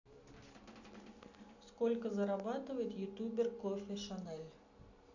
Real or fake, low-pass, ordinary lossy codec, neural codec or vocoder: real; 7.2 kHz; none; none